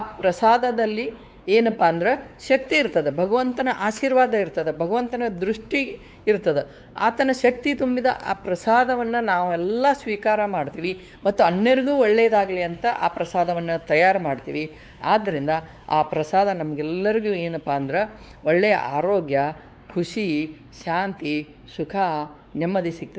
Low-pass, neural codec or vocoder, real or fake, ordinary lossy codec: none; codec, 16 kHz, 4 kbps, X-Codec, WavLM features, trained on Multilingual LibriSpeech; fake; none